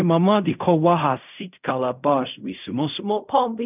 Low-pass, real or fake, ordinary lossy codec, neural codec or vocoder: 3.6 kHz; fake; none; codec, 16 kHz in and 24 kHz out, 0.4 kbps, LongCat-Audio-Codec, fine tuned four codebook decoder